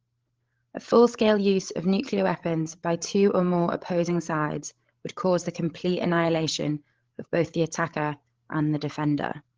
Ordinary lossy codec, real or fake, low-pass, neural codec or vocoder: Opus, 16 kbps; fake; 7.2 kHz; codec, 16 kHz, 16 kbps, FreqCodec, larger model